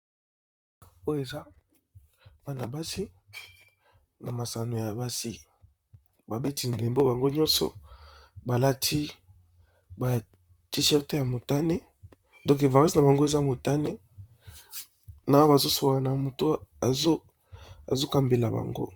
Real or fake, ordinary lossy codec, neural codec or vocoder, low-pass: fake; Opus, 64 kbps; vocoder, 44.1 kHz, 128 mel bands, Pupu-Vocoder; 19.8 kHz